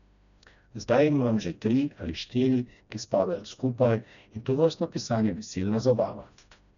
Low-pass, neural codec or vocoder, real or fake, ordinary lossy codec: 7.2 kHz; codec, 16 kHz, 1 kbps, FreqCodec, smaller model; fake; none